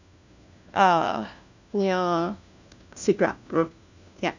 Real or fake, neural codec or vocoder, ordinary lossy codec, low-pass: fake; codec, 16 kHz, 1 kbps, FunCodec, trained on LibriTTS, 50 frames a second; none; 7.2 kHz